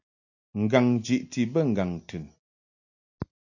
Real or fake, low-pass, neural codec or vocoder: real; 7.2 kHz; none